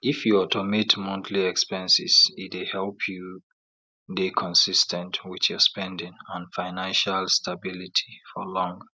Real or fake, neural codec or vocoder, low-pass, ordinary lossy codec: real; none; none; none